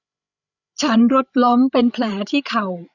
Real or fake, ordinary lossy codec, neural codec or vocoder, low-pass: fake; none; codec, 16 kHz, 8 kbps, FreqCodec, larger model; 7.2 kHz